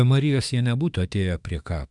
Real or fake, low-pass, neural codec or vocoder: fake; 10.8 kHz; autoencoder, 48 kHz, 32 numbers a frame, DAC-VAE, trained on Japanese speech